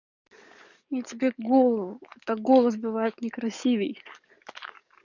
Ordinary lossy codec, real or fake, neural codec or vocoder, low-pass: none; fake; codec, 44.1 kHz, 7.8 kbps, DAC; 7.2 kHz